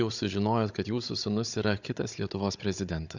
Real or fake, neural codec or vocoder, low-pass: fake; codec, 16 kHz, 16 kbps, FunCodec, trained on Chinese and English, 50 frames a second; 7.2 kHz